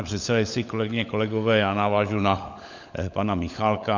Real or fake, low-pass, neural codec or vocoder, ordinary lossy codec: fake; 7.2 kHz; codec, 16 kHz, 16 kbps, FunCodec, trained on LibriTTS, 50 frames a second; MP3, 64 kbps